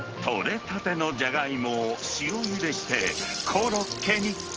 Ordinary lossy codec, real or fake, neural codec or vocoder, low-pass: Opus, 16 kbps; real; none; 7.2 kHz